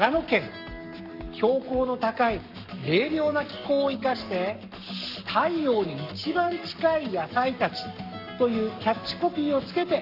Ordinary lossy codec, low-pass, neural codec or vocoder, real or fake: none; 5.4 kHz; codec, 44.1 kHz, 7.8 kbps, Pupu-Codec; fake